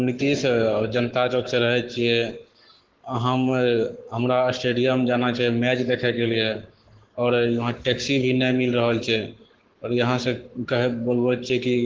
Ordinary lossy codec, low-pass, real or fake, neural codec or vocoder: Opus, 16 kbps; 7.2 kHz; fake; codec, 44.1 kHz, 7.8 kbps, Pupu-Codec